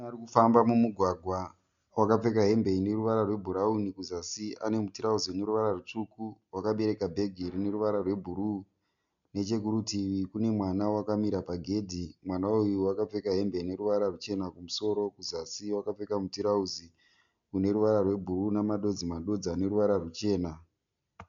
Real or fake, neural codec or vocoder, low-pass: real; none; 7.2 kHz